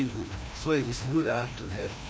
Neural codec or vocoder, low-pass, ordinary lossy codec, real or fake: codec, 16 kHz, 1 kbps, FreqCodec, larger model; none; none; fake